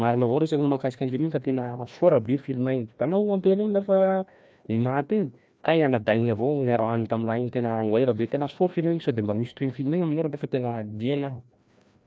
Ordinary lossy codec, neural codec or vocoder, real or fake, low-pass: none; codec, 16 kHz, 1 kbps, FreqCodec, larger model; fake; none